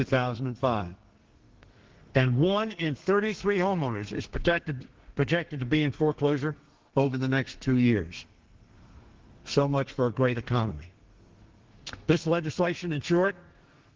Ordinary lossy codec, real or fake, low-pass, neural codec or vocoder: Opus, 16 kbps; fake; 7.2 kHz; codec, 32 kHz, 1.9 kbps, SNAC